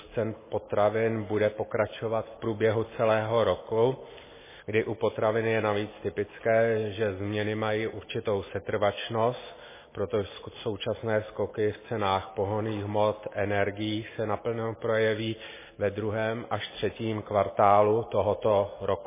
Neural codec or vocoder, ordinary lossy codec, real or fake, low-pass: none; MP3, 16 kbps; real; 3.6 kHz